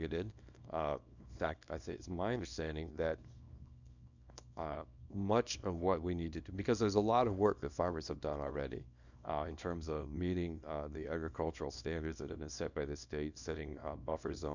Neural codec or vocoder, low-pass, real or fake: codec, 24 kHz, 0.9 kbps, WavTokenizer, small release; 7.2 kHz; fake